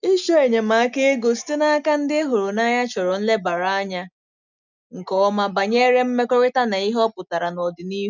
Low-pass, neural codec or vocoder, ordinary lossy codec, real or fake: 7.2 kHz; none; none; real